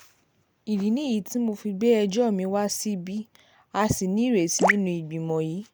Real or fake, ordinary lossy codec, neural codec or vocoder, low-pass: real; none; none; none